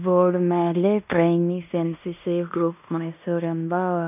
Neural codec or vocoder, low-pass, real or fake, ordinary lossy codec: codec, 16 kHz in and 24 kHz out, 0.9 kbps, LongCat-Audio-Codec, fine tuned four codebook decoder; 3.6 kHz; fake; none